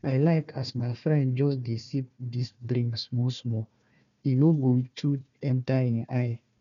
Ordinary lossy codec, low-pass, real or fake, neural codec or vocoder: none; 7.2 kHz; fake; codec, 16 kHz, 1 kbps, FunCodec, trained on Chinese and English, 50 frames a second